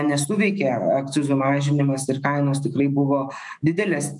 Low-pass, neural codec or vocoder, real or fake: 10.8 kHz; autoencoder, 48 kHz, 128 numbers a frame, DAC-VAE, trained on Japanese speech; fake